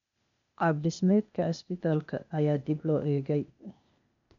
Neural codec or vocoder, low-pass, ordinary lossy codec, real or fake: codec, 16 kHz, 0.8 kbps, ZipCodec; 7.2 kHz; none; fake